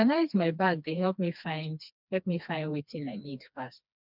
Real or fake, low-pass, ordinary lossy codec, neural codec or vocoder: fake; 5.4 kHz; none; codec, 16 kHz, 2 kbps, FreqCodec, smaller model